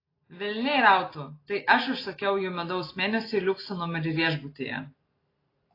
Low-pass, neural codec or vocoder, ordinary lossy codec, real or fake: 5.4 kHz; none; AAC, 24 kbps; real